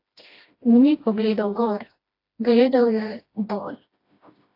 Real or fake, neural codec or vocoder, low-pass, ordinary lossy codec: fake; codec, 16 kHz, 1 kbps, FreqCodec, smaller model; 5.4 kHz; AAC, 32 kbps